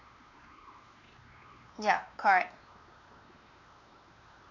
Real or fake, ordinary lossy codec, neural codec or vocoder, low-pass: fake; none; codec, 16 kHz, 2 kbps, X-Codec, WavLM features, trained on Multilingual LibriSpeech; 7.2 kHz